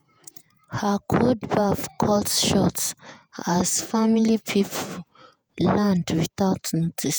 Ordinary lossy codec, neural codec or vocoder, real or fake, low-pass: none; vocoder, 48 kHz, 128 mel bands, Vocos; fake; none